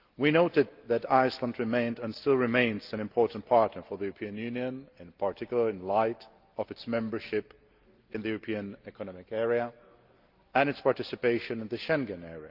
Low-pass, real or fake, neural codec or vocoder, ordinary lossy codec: 5.4 kHz; real; none; Opus, 32 kbps